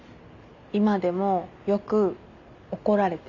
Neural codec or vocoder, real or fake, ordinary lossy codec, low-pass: none; real; none; 7.2 kHz